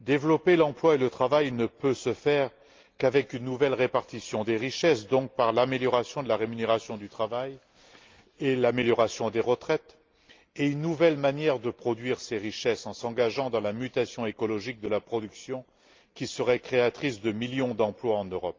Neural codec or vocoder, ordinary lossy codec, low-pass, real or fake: none; Opus, 32 kbps; 7.2 kHz; real